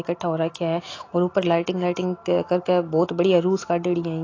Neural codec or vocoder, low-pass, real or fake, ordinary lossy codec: none; 7.2 kHz; real; AAC, 32 kbps